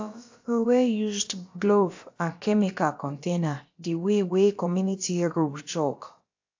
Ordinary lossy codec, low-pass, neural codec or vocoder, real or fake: AAC, 48 kbps; 7.2 kHz; codec, 16 kHz, about 1 kbps, DyCAST, with the encoder's durations; fake